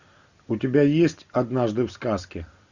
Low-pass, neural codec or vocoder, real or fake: 7.2 kHz; none; real